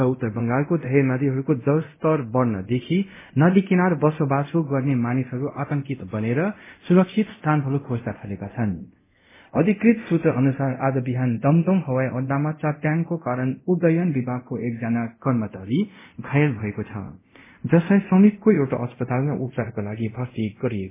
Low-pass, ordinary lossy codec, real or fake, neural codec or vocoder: 3.6 kHz; MP3, 16 kbps; fake; codec, 24 kHz, 0.5 kbps, DualCodec